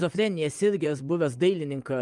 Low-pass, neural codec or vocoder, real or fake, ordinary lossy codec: 10.8 kHz; vocoder, 24 kHz, 100 mel bands, Vocos; fake; Opus, 32 kbps